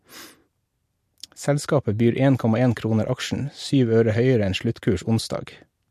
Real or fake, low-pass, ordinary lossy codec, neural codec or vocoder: real; 14.4 kHz; MP3, 64 kbps; none